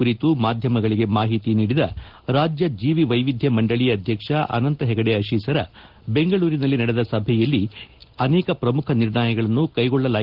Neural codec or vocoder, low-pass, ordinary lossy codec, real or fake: none; 5.4 kHz; Opus, 16 kbps; real